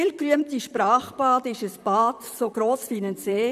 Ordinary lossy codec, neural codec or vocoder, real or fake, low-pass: none; vocoder, 44.1 kHz, 128 mel bands, Pupu-Vocoder; fake; 14.4 kHz